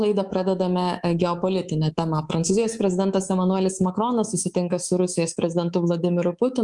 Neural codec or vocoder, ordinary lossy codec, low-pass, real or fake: codec, 24 kHz, 3.1 kbps, DualCodec; Opus, 32 kbps; 10.8 kHz; fake